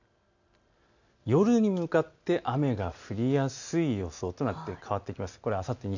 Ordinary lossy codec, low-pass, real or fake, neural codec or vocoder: none; 7.2 kHz; real; none